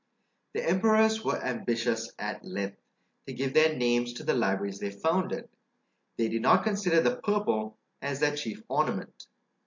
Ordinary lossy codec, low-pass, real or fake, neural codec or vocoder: MP3, 48 kbps; 7.2 kHz; real; none